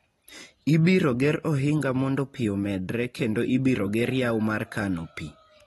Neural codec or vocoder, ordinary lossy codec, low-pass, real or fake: none; AAC, 32 kbps; 19.8 kHz; real